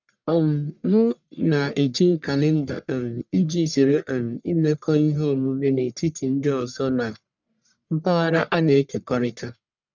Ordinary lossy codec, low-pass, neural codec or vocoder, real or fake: none; 7.2 kHz; codec, 44.1 kHz, 1.7 kbps, Pupu-Codec; fake